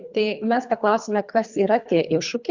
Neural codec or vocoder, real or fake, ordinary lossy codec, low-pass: codec, 24 kHz, 3 kbps, HILCodec; fake; Opus, 64 kbps; 7.2 kHz